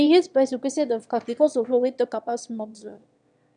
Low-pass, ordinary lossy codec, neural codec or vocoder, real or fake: 9.9 kHz; none; autoencoder, 22.05 kHz, a latent of 192 numbers a frame, VITS, trained on one speaker; fake